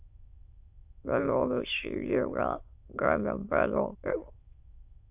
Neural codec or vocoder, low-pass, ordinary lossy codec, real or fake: autoencoder, 22.05 kHz, a latent of 192 numbers a frame, VITS, trained on many speakers; 3.6 kHz; AAC, 32 kbps; fake